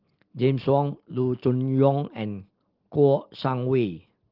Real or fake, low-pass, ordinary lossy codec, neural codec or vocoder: real; 5.4 kHz; Opus, 16 kbps; none